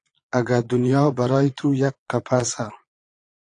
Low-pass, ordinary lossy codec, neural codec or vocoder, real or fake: 9.9 kHz; AAC, 48 kbps; vocoder, 22.05 kHz, 80 mel bands, Vocos; fake